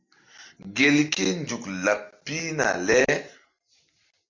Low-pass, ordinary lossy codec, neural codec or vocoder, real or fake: 7.2 kHz; MP3, 64 kbps; none; real